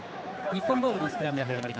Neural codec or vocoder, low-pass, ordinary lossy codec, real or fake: codec, 16 kHz, 4 kbps, X-Codec, HuBERT features, trained on general audio; none; none; fake